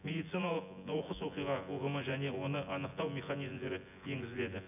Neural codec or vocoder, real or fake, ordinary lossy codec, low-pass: vocoder, 24 kHz, 100 mel bands, Vocos; fake; none; 3.6 kHz